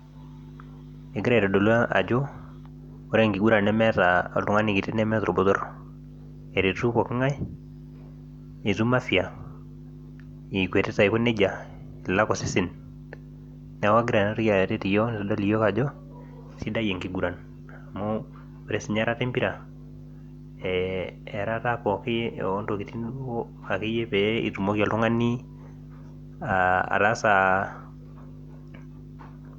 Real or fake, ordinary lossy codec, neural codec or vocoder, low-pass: real; none; none; 19.8 kHz